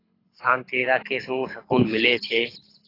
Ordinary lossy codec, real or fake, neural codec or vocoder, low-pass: AAC, 24 kbps; fake; codec, 24 kHz, 6 kbps, HILCodec; 5.4 kHz